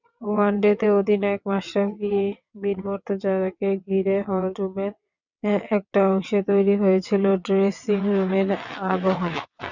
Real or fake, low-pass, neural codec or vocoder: fake; 7.2 kHz; vocoder, 22.05 kHz, 80 mel bands, WaveNeXt